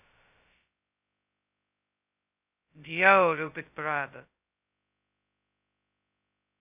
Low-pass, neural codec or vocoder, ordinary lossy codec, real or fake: 3.6 kHz; codec, 16 kHz, 0.2 kbps, FocalCodec; AAC, 32 kbps; fake